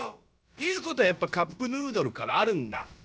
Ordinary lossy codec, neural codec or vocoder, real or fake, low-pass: none; codec, 16 kHz, about 1 kbps, DyCAST, with the encoder's durations; fake; none